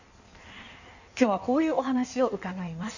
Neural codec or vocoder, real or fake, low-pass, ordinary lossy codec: codec, 16 kHz in and 24 kHz out, 1.1 kbps, FireRedTTS-2 codec; fake; 7.2 kHz; none